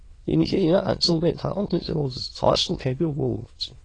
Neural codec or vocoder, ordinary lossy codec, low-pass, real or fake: autoencoder, 22.05 kHz, a latent of 192 numbers a frame, VITS, trained on many speakers; AAC, 32 kbps; 9.9 kHz; fake